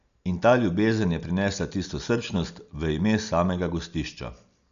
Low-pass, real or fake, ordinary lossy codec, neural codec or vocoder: 7.2 kHz; real; none; none